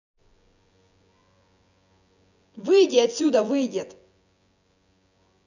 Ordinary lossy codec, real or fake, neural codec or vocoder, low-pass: none; fake; vocoder, 24 kHz, 100 mel bands, Vocos; 7.2 kHz